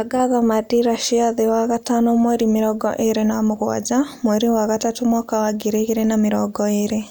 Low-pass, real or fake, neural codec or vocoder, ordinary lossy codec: none; real; none; none